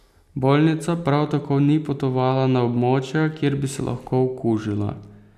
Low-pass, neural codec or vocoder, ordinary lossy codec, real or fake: 14.4 kHz; none; none; real